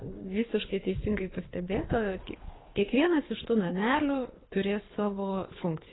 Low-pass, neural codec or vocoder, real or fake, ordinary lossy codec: 7.2 kHz; codec, 24 kHz, 3 kbps, HILCodec; fake; AAC, 16 kbps